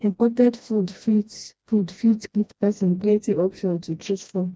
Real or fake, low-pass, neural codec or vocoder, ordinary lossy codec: fake; none; codec, 16 kHz, 1 kbps, FreqCodec, smaller model; none